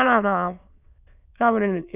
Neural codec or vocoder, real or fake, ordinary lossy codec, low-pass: autoencoder, 22.05 kHz, a latent of 192 numbers a frame, VITS, trained on many speakers; fake; none; 3.6 kHz